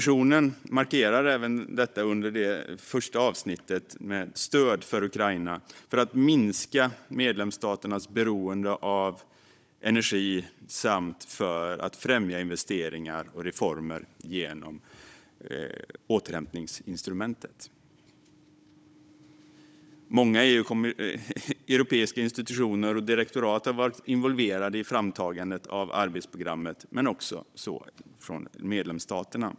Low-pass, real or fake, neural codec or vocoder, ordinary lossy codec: none; fake; codec, 16 kHz, 16 kbps, FunCodec, trained on Chinese and English, 50 frames a second; none